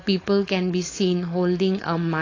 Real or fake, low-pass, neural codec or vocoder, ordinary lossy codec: fake; 7.2 kHz; codec, 16 kHz, 4.8 kbps, FACodec; AAC, 32 kbps